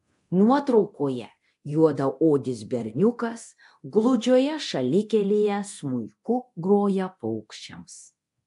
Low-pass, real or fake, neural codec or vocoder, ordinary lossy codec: 10.8 kHz; fake; codec, 24 kHz, 0.9 kbps, DualCodec; AAC, 64 kbps